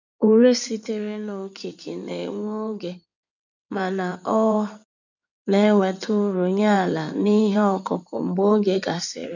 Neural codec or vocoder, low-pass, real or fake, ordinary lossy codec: codec, 16 kHz in and 24 kHz out, 2.2 kbps, FireRedTTS-2 codec; 7.2 kHz; fake; none